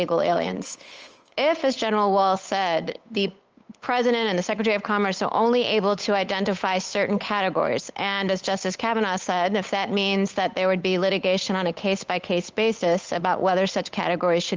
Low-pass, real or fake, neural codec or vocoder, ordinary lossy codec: 7.2 kHz; fake; codec, 16 kHz, 8 kbps, FunCodec, trained on Chinese and English, 25 frames a second; Opus, 24 kbps